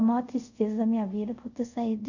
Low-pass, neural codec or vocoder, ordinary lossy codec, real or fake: 7.2 kHz; codec, 24 kHz, 0.5 kbps, DualCodec; none; fake